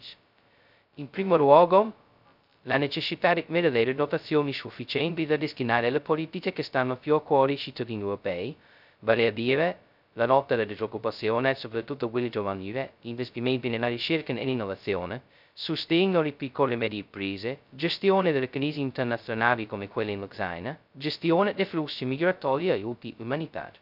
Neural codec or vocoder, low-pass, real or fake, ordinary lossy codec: codec, 16 kHz, 0.2 kbps, FocalCodec; 5.4 kHz; fake; none